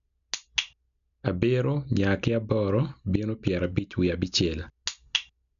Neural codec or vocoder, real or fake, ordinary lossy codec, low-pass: none; real; none; 7.2 kHz